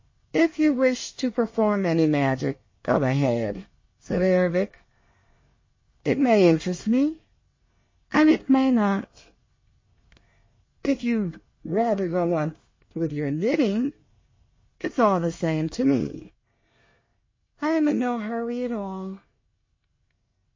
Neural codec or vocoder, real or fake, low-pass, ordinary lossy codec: codec, 24 kHz, 1 kbps, SNAC; fake; 7.2 kHz; MP3, 32 kbps